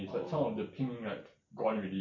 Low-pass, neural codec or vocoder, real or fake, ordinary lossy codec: 7.2 kHz; none; real; MP3, 48 kbps